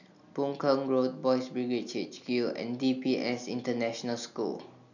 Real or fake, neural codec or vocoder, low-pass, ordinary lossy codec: real; none; 7.2 kHz; none